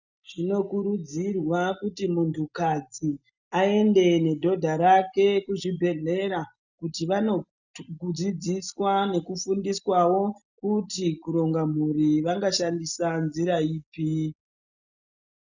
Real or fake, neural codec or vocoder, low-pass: real; none; 7.2 kHz